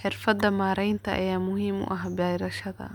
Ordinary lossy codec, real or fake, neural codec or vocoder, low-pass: none; real; none; 19.8 kHz